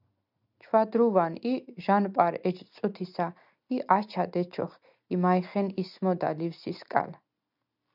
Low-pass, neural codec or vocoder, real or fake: 5.4 kHz; none; real